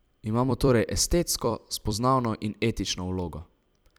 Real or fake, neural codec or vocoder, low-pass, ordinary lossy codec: fake; vocoder, 44.1 kHz, 128 mel bands every 256 samples, BigVGAN v2; none; none